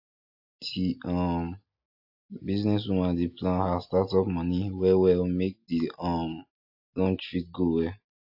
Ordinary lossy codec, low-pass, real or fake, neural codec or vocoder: AAC, 48 kbps; 5.4 kHz; real; none